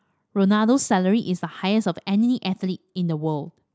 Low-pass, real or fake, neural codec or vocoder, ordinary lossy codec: none; real; none; none